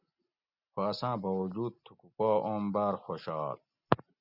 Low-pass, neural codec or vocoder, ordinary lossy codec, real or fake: 5.4 kHz; none; Opus, 64 kbps; real